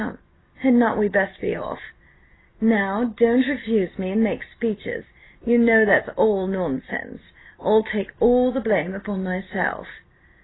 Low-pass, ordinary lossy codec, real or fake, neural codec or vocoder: 7.2 kHz; AAC, 16 kbps; real; none